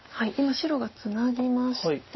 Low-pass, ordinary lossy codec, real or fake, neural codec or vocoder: 7.2 kHz; MP3, 24 kbps; real; none